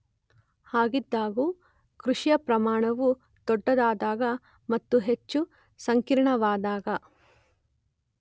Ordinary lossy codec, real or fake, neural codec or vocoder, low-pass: none; real; none; none